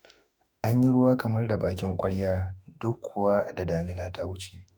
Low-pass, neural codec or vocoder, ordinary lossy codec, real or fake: none; autoencoder, 48 kHz, 32 numbers a frame, DAC-VAE, trained on Japanese speech; none; fake